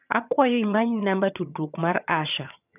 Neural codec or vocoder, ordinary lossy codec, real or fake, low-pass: vocoder, 22.05 kHz, 80 mel bands, HiFi-GAN; none; fake; 3.6 kHz